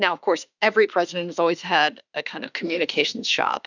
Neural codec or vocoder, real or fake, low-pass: autoencoder, 48 kHz, 32 numbers a frame, DAC-VAE, trained on Japanese speech; fake; 7.2 kHz